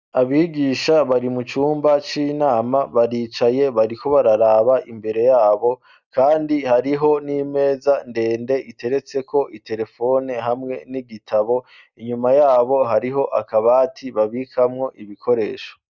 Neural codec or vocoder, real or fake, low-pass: none; real; 7.2 kHz